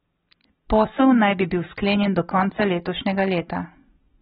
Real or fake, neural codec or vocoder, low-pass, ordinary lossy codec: fake; vocoder, 44.1 kHz, 128 mel bands every 256 samples, BigVGAN v2; 19.8 kHz; AAC, 16 kbps